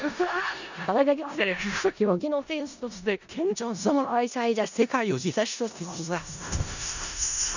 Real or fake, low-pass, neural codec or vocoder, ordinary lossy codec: fake; 7.2 kHz; codec, 16 kHz in and 24 kHz out, 0.4 kbps, LongCat-Audio-Codec, four codebook decoder; none